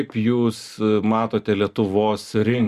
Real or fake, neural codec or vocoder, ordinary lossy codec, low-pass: real; none; Opus, 64 kbps; 14.4 kHz